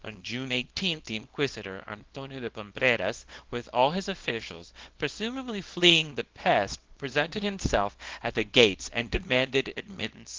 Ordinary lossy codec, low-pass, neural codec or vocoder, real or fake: Opus, 16 kbps; 7.2 kHz; codec, 24 kHz, 0.9 kbps, WavTokenizer, small release; fake